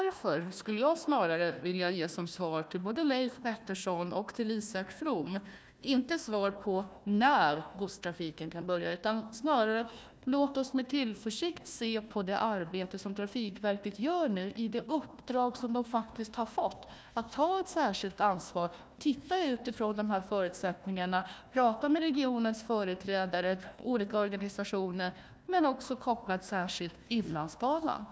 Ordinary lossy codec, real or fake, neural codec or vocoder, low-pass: none; fake; codec, 16 kHz, 1 kbps, FunCodec, trained on Chinese and English, 50 frames a second; none